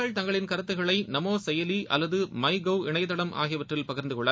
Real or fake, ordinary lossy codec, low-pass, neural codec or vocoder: real; none; none; none